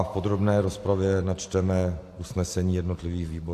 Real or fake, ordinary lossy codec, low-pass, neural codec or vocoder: real; AAC, 64 kbps; 14.4 kHz; none